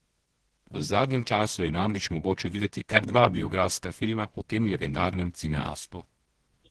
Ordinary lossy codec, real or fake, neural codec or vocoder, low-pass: Opus, 16 kbps; fake; codec, 24 kHz, 0.9 kbps, WavTokenizer, medium music audio release; 10.8 kHz